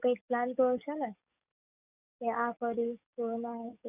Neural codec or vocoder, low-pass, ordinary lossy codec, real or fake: codec, 44.1 kHz, 7.8 kbps, DAC; 3.6 kHz; none; fake